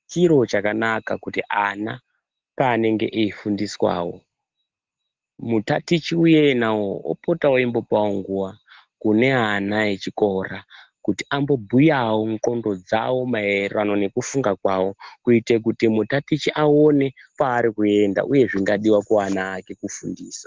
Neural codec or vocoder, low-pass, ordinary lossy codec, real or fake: none; 7.2 kHz; Opus, 16 kbps; real